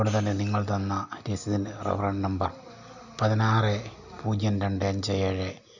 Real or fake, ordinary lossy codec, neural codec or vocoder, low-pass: real; none; none; 7.2 kHz